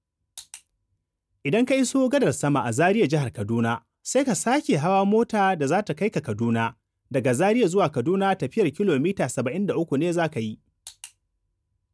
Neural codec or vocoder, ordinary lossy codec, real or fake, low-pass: none; none; real; none